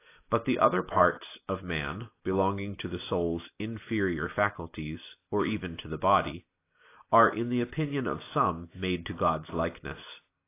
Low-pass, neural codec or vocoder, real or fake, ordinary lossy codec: 3.6 kHz; none; real; AAC, 24 kbps